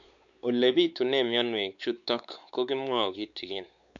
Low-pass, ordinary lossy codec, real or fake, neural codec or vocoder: 7.2 kHz; none; fake; codec, 16 kHz, 4 kbps, X-Codec, WavLM features, trained on Multilingual LibriSpeech